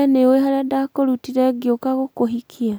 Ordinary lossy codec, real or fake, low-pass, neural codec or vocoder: none; real; none; none